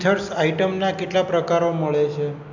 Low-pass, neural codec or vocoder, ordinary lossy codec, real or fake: 7.2 kHz; none; none; real